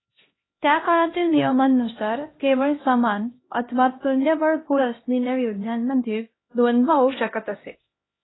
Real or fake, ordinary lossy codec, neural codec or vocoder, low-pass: fake; AAC, 16 kbps; codec, 16 kHz, 1 kbps, X-Codec, HuBERT features, trained on LibriSpeech; 7.2 kHz